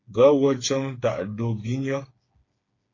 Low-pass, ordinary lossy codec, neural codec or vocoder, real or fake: 7.2 kHz; AAC, 32 kbps; codec, 16 kHz, 4 kbps, FreqCodec, smaller model; fake